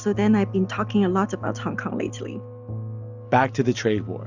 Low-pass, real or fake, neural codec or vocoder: 7.2 kHz; real; none